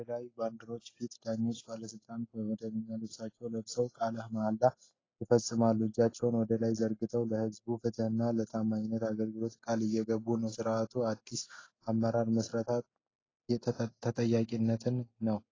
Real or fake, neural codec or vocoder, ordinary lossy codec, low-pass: real; none; AAC, 32 kbps; 7.2 kHz